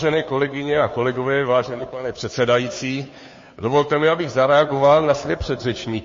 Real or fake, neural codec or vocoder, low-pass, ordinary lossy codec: fake; codec, 16 kHz, 2 kbps, FunCodec, trained on Chinese and English, 25 frames a second; 7.2 kHz; MP3, 32 kbps